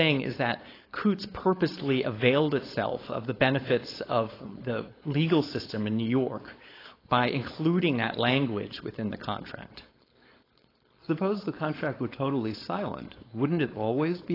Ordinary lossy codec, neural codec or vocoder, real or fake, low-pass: AAC, 24 kbps; codec, 16 kHz, 4.8 kbps, FACodec; fake; 5.4 kHz